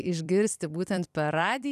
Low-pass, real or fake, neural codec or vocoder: 14.4 kHz; fake; vocoder, 44.1 kHz, 128 mel bands every 256 samples, BigVGAN v2